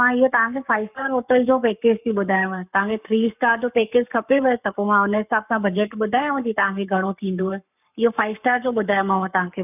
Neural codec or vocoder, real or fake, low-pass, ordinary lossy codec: codec, 44.1 kHz, 7.8 kbps, Pupu-Codec; fake; 3.6 kHz; none